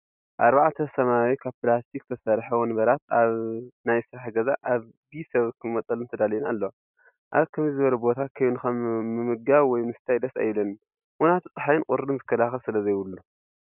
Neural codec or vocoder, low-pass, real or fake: none; 3.6 kHz; real